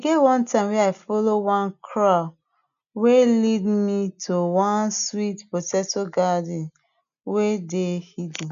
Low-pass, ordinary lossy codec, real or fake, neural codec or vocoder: 7.2 kHz; none; real; none